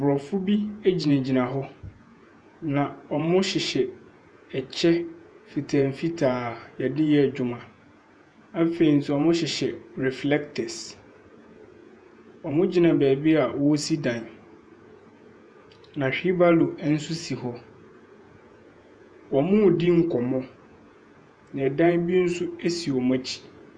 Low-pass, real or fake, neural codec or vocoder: 9.9 kHz; fake; vocoder, 48 kHz, 128 mel bands, Vocos